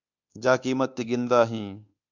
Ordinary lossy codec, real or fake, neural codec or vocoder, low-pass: Opus, 64 kbps; fake; codec, 24 kHz, 1.2 kbps, DualCodec; 7.2 kHz